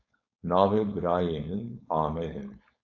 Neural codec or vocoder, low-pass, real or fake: codec, 16 kHz, 4.8 kbps, FACodec; 7.2 kHz; fake